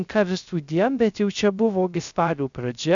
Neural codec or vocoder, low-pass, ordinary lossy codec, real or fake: codec, 16 kHz, 0.3 kbps, FocalCodec; 7.2 kHz; MP3, 96 kbps; fake